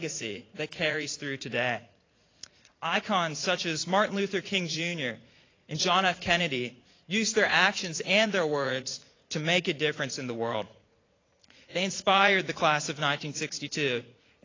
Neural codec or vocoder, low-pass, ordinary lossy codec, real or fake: vocoder, 44.1 kHz, 80 mel bands, Vocos; 7.2 kHz; AAC, 32 kbps; fake